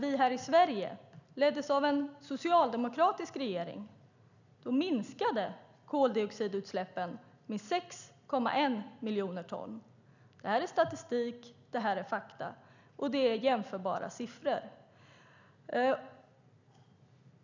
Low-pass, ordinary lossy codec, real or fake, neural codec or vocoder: 7.2 kHz; none; real; none